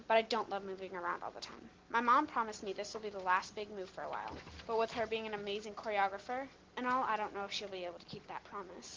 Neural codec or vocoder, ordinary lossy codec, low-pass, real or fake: none; Opus, 16 kbps; 7.2 kHz; real